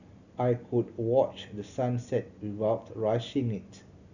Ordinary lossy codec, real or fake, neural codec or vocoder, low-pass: none; real; none; 7.2 kHz